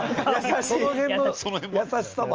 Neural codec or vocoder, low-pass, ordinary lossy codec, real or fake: none; 7.2 kHz; Opus, 24 kbps; real